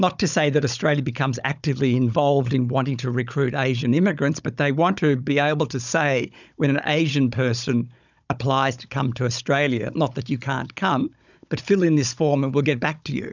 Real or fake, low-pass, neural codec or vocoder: fake; 7.2 kHz; codec, 16 kHz, 16 kbps, FunCodec, trained on Chinese and English, 50 frames a second